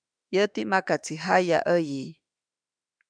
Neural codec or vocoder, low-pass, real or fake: autoencoder, 48 kHz, 32 numbers a frame, DAC-VAE, trained on Japanese speech; 9.9 kHz; fake